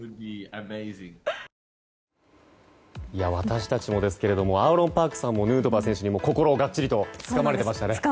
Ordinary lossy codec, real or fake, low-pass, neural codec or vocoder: none; real; none; none